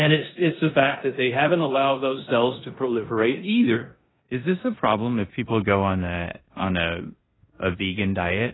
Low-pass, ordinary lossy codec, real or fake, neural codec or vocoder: 7.2 kHz; AAC, 16 kbps; fake; codec, 16 kHz in and 24 kHz out, 0.9 kbps, LongCat-Audio-Codec, four codebook decoder